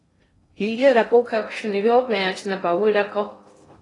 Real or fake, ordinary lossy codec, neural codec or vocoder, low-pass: fake; AAC, 32 kbps; codec, 16 kHz in and 24 kHz out, 0.6 kbps, FocalCodec, streaming, 2048 codes; 10.8 kHz